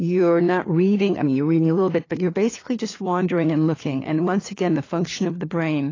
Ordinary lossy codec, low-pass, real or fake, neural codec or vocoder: AAC, 32 kbps; 7.2 kHz; fake; codec, 16 kHz, 4 kbps, FunCodec, trained on LibriTTS, 50 frames a second